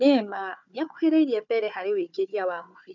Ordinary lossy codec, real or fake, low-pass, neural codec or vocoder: none; fake; 7.2 kHz; codec, 16 kHz, 4 kbps, FunCodec, trained on Chinese and English, 50 frames a second